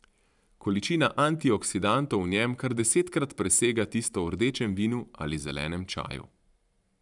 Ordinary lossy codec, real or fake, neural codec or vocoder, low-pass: none; fake; vocoder, 44.1 kHz, 128 mel bands every 512 samples, BigVGAN v2; 10.8 kHz